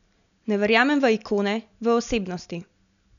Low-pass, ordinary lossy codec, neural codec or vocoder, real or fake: 7.2 kHz; none; none; real